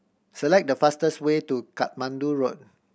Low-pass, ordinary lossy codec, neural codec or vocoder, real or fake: none; none; none; real